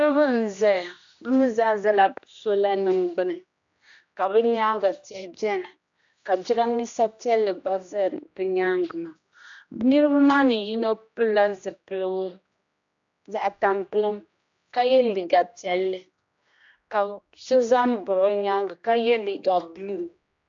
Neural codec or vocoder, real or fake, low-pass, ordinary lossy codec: codec, 16 kHz, 1 kbps, X-Codec, HuBERT features, trained on general audio; fake; 7.2 kHz; AAC, 64 kbps